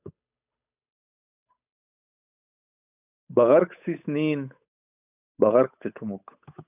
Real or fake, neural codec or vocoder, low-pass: fake; codec, 16 kHz, 8 kbps, FunCodec, trained on Chinese and English, 25 frames a second; 3.6 kHz